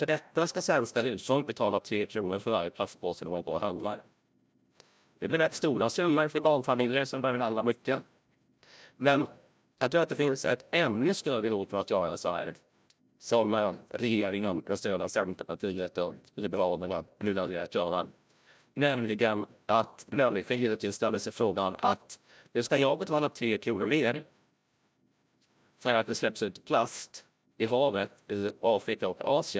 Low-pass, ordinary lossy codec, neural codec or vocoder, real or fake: none; none; codec, 16 kHz, 0.5 kbps, FreqCodec, larger model; fake